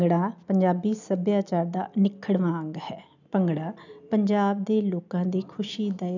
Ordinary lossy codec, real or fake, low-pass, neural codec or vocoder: none; real; 7.2 kHz; none